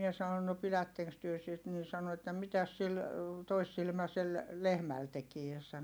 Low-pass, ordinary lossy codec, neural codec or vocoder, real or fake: none; none; none; real